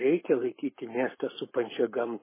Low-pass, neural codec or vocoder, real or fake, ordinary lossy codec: 3.6 kHz; codec, 44.1 kHz, 7.8 kbps, Pupu-Codec; fake; MP3, 16 kbps